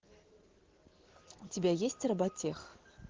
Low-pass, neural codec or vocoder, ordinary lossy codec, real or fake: 7.2 kHz; none; Opus, 16 kbps; real